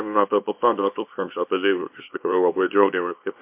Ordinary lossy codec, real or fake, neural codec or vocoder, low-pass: MP3, 32 kbps; fake; codec, 24 kHz, 0.9 kbps, WavTokenizer, small release; 3.6 kHz